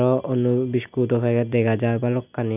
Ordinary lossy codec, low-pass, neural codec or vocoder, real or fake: none; 3.6 kHz; none; real